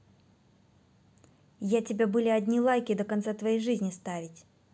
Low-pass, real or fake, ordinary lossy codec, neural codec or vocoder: none; real; none; none